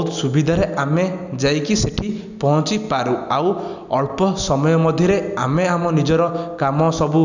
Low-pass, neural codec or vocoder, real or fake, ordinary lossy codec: 7.2 kHz; none; real; none